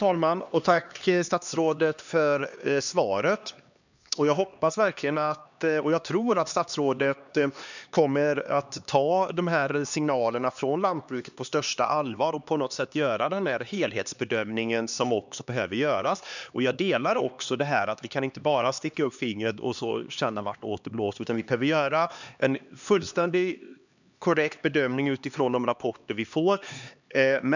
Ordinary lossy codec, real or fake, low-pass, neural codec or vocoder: none; fake; 7.2 kHz; codec, 16 kHz, 2 kbps, X-Codec, HuBERT features, trained on LibriSpeech